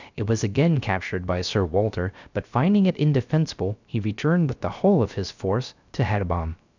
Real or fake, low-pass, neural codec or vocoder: fake; 7.2 kHz; codec, 16 kHz, 0.3 kbps, FocalCodec